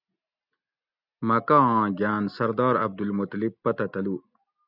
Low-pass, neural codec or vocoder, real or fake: 5.4 kHz; none; real